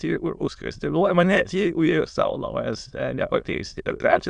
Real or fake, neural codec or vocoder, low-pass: fake; autoencoder, 22.05 kHz, a latent of 192 numbers a frame, VITS, trained on many speakers; 9.9 kHz